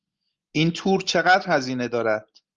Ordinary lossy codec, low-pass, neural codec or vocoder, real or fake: Opus, 32 kbps; 7.2 kHz; none; real